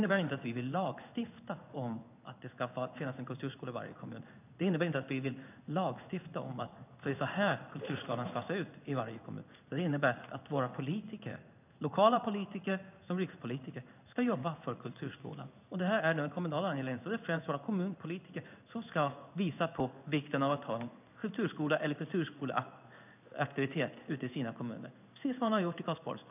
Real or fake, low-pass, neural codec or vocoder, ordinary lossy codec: fake; 3.6 kHz; codec, 16 kHz in and 24 kHz out, 1 kbps, XY-Tokenizer; none